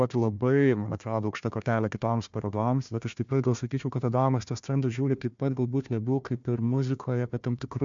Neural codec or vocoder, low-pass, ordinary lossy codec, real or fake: codec, 16 kHz, 1 kbps, FunCodec, trained on Chinese and English, 50 frames a second; 7.2 kHz; MP3, 64 kbps; fake